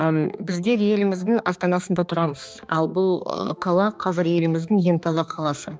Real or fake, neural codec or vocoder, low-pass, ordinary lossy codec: fake; codec, 16 kHz, 2 kbps, X-Codec, HuBERT features, trained on balanced general audio; none; none